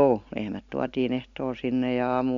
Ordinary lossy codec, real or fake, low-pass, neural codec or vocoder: MP3, 64 kbps; real; 7.2 kHz; none